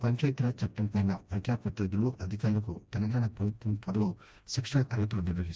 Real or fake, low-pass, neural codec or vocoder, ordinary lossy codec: fake; none; codec, 16 kHz, 1 kbps, FreqCodec, smaller model; none